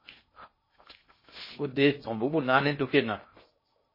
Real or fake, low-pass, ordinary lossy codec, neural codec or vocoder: fake; 5.4 kHz; MP3, 24 kbps; codec, 16 kHz in and 24 kHz out, 0.6 kbps, FocalCodec, streaming, 2048 codes